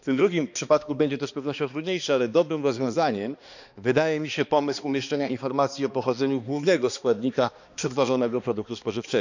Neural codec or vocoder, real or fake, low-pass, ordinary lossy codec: codec, 16 kHz, 2 kbps, X-Codec, HuBERT features, trained on balanced general audio; fake; 7.2 kHz; none